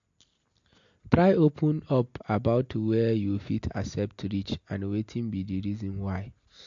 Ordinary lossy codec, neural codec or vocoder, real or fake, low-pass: MP3, 48 kbps; none; real; 7.2 kHz